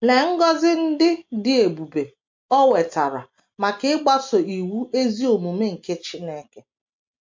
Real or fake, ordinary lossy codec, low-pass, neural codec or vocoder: real; MP3, 48 kbps; 7.2 kHz; none